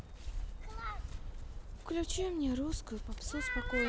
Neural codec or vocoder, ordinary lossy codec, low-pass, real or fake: none; none; none; real